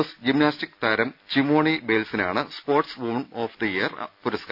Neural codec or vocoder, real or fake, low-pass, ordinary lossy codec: none; real; 5.4 kHz; none